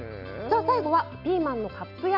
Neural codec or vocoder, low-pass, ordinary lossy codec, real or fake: none; 5.4 kHz; none; real